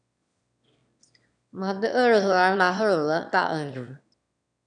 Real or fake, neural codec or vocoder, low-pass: fake; autoencoder, 22.05 kHz, a latent of 192 numbers a frame, VITS, trained on one speaker; 9.9 kHz